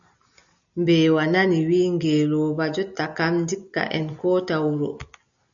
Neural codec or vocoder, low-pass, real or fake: none; 7.2 kHz; real